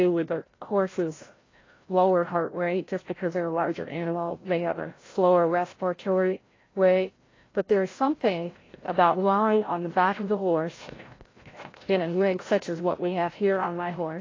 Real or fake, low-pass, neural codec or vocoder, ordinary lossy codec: fake; 7.2 kHz; codec, 16 kHz, 0.5 kbps, FreqCodec, larger model; AAC, 32 kbps